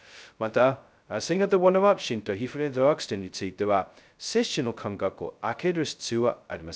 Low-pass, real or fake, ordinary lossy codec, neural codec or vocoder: none; fake; none; codec, 16 kHz, 0.2 kbps, FocalCodec